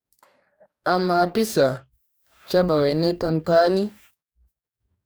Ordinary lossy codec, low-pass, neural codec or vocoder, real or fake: none; none; codec, 44.1 kHz, 2.6 kbps, DAC; fake